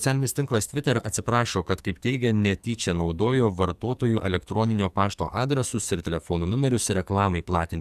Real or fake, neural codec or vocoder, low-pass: fake; codec, 44.1 kHz, 2.6 kbps, SNAC; 14.4 kHz